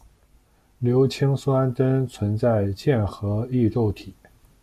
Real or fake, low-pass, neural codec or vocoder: real; 14.4 kHz; none